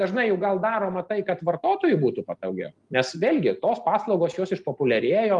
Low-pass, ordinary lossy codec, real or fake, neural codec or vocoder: 10.8 kHz; Opus, 32 kbps; real; none